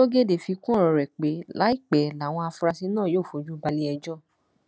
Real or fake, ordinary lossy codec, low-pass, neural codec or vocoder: real; none; none; none